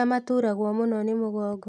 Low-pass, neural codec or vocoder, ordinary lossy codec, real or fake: none; none; none; real